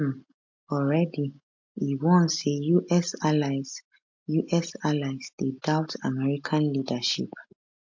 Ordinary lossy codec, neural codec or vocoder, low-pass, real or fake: MP3, 48 kbps; none; 7.2 kHz; real